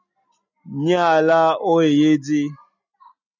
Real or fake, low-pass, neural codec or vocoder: real; 7.2 kHz; none